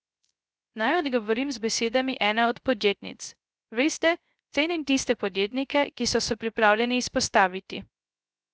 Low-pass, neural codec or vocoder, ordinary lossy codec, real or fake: none; codec, 16 kHz, 0.3 kbps, FocalCodec; none; fake